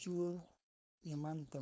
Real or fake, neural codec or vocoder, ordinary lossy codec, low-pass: fake; codec, 16 kHz, 4.8 kbps, FACodec; none; none